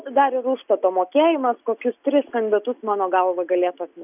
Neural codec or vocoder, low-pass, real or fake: none; 3.6 kHz; real